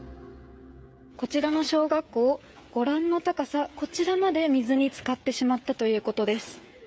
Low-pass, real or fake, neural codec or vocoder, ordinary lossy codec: none; fake; codec, 16 kHz, 16 kbps, FreqCodec, smaller model; none